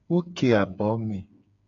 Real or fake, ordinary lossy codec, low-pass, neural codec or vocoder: fake; AAC, 64 kbps; 7.2 kHz; codec, 16 kHz, 8 kbps, FreqCodec, smaller model